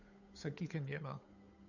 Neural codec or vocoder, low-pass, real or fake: codec, 16 kHz, 8 kbps, FunCodec, trained on Chinese and English, 25 frames a second; 7.2 kHz; fake